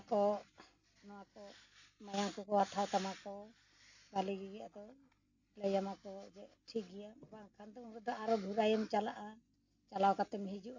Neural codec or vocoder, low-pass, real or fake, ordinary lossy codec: none; 7.2 kHz; real; none